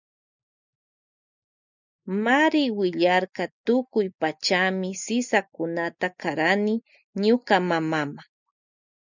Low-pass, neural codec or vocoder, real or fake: 7.2 kHz; none; real